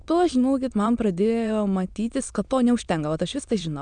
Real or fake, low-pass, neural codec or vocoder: fake; 9.9 kHz; autoencoder, 22.05 kHz, a latent of 192 numbers a frame, VITS, trained on many speakers